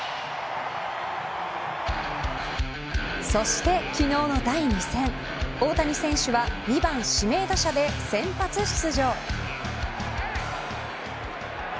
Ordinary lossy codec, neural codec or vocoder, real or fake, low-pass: none; none; real; none